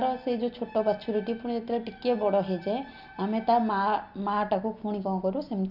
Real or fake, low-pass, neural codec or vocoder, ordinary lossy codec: real; 5.4 kHz; none; none